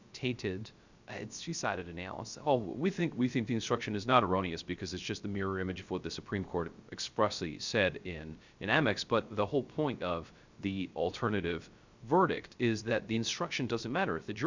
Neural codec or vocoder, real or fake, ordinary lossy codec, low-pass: codec, 16 kHz, 0.3 kbps, FocalCodec; fake; Opus, 64 kbps; 7.2 kHz